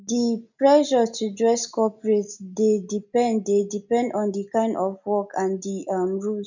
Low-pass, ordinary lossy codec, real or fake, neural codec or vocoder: 7.2 kHz; none; real; none